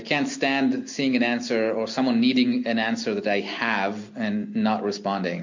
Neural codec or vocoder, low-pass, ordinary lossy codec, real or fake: none; 7.2 kHz; MP3, 48 kbps; real